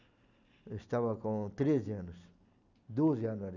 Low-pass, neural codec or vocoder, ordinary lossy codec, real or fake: 7.2 kHz; none; none; real